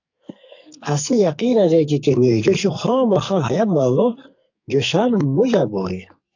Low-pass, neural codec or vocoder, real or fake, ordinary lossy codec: 7.2 kHz; codec, 44.1 kHz, 2.6 kbps, SNAC; fake; AAC, 48 kbps